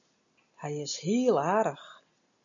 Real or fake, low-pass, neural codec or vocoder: real; 7.2 kHz; none